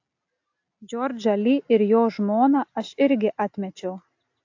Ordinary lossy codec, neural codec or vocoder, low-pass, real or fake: AAC, 48 kbps; none; 7.2 kHz; real